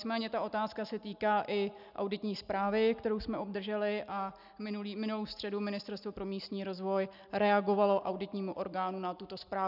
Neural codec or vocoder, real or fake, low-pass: none; real; 5.4 kHz